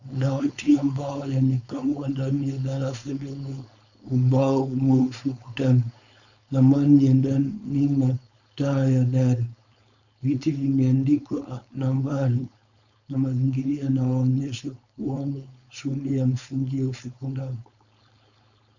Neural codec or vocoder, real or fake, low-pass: codec, 16 kHz, 4.8 kbps, FACodec; fake; 7.2 kHz